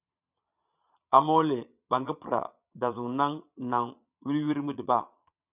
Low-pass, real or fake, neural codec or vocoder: 3.6 kHz; fake; vocoder, 22.05 kHz, 80 mel bands, Vocos